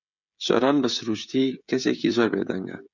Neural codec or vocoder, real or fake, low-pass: codec, 16 kHz, 16 kbps, FreqCodec, smaller model; fake; 7.2 kHz